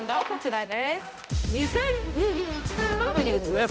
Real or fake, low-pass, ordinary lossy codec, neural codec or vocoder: fake; none; none; codec, 16 kHz, 0.5 kbps, X-Codec, HuBERT features, trained on balanced general audio